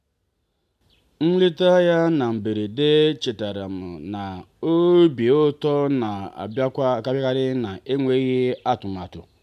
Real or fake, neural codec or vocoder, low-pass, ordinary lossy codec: real; none; 14.4 kHz; AAC, 96 kbps